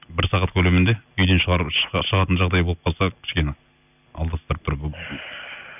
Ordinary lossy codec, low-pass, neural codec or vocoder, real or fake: none; 3.6 kHz; none; real